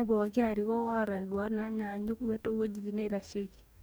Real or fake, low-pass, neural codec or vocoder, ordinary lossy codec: fake; none; codec, 44.1 kHz, 2.6 kbps, DAC; none